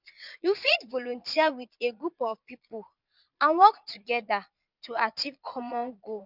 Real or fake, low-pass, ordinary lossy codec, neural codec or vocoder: fake; 5.4 kHz; none; vocoder, 22.05 kHz, 80 mel bands, Vocos